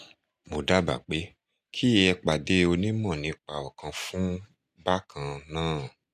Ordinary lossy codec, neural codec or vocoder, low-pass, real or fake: none; none; 14.4 kHz; real